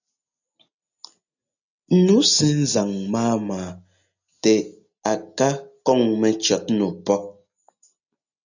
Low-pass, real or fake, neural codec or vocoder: 7.2 kHz; real; none